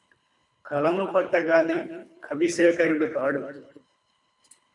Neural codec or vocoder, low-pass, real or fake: codec, 24 kHz, 3 kbps, HILCodec; 10.8 kHz; fake